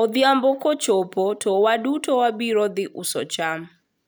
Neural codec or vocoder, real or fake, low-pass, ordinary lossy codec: none; real; none; none